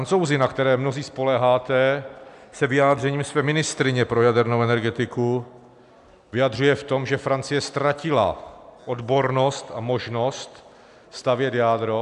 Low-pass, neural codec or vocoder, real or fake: 10.8 kHz; none; real